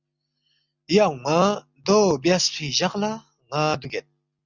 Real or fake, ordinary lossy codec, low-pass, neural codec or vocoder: real; Opus, 64 kbps; 7.2 kHz; none